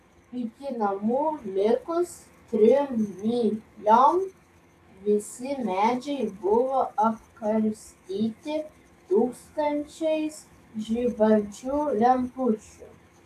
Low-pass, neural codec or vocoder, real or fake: 14.4 kHz; codec, 44.1 kHz, 7.8 kbps, DAC; fake